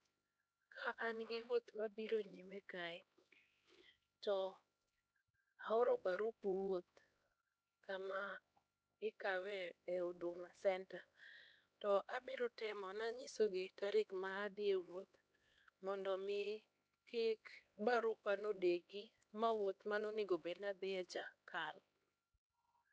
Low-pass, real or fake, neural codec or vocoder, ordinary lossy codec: none; fake; codec, 16 kHz, 2 kbps, X-Codec, HuBERT features, trained on LibriSpeech; none